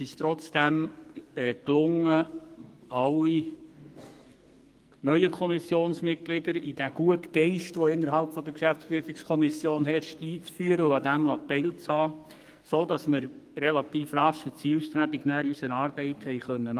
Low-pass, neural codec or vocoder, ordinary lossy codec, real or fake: 14.4 kHz; codec, 44.1 kHz, 2.6 kbps, SNAC; Opus, 32 kbps; fake